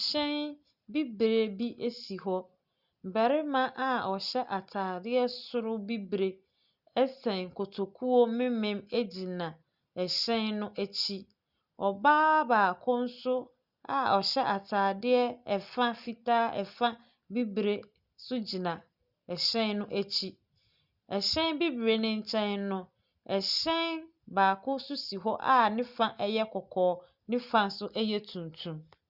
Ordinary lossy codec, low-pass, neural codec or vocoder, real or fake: Opus, 64 kbps; 5.4 kHz; none; real